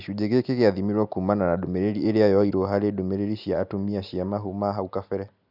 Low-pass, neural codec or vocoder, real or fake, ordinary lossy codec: 5.4 kHz; none; real; none